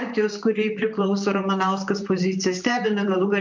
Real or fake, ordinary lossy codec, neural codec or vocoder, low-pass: fake; MP3, 64 kbps; vocoder, 44.1 kHz, 128 mel bands, Pupu-Vocoder; 7.2 kHz